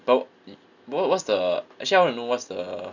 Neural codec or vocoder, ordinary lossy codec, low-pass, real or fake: none; none; 7.2 kHz; real